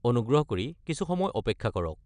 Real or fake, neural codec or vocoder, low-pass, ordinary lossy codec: real; none; 9.9 kHz; none